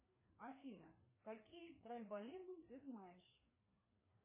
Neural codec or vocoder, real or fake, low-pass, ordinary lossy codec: codec, 16 kHz, 2 kbps, FreqCodec, larger model; fake; 3.6 kHz; MP3, 16 kbps